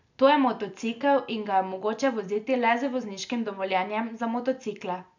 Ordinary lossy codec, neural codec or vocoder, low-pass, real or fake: none; none; 7.2 kHz; real